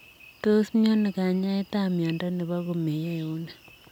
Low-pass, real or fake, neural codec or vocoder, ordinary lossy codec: 19.8 kHz; real; none; none